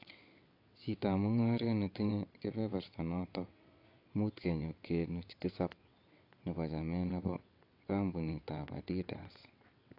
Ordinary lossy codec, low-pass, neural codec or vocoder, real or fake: AAC, 32 kbps; 5.4 kHz; vocoder, 22.05 kHz, 80 mel bands, WaveNeXt; fake